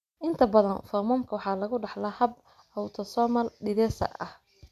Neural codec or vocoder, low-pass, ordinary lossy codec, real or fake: none; 14.4 kHz; MP3, 96 kbps; real